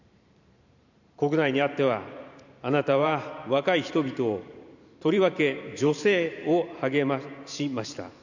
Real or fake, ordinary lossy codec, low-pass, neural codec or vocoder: fake; none; 7.2 kHz; vocoder, 44.1 kHz, 128 mel bands every 512 samples, BigVGAN v2